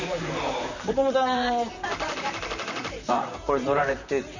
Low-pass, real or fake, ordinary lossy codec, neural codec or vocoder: 7.2 kHz; fake; none; vocoder, 44.1 kHz, 128 mel bands, Pupu-Vocoder